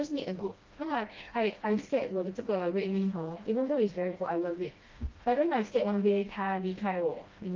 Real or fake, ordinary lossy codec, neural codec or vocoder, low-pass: fake; Opus, 24 kbps; codec, 16 kHz, 1 kbps, FreqCodec, smaller model; 7.2 kHz